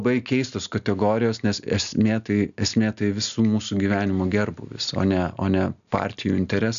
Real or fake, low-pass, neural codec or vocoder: real; 7.2 kHz; none